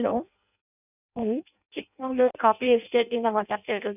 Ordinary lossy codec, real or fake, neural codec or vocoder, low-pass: none; fake; codec, 16 kHz in and 24 kHz out, 0.6 kbps, FireRedTTS-2 codec; 3.6 kHz